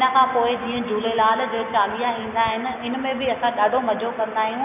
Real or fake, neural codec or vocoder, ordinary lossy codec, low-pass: real; none; none; 3.6 kHz